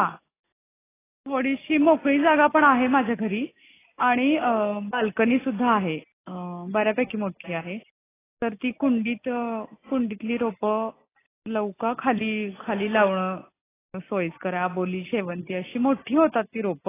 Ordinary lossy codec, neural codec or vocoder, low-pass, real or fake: AAC, 16 kbps; none; 3.6 kHz; real